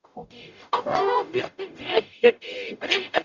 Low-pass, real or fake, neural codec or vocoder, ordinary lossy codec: 7.2 kHz; fake; codec, 44.1 kHz, 0.9 kbps, DAC; none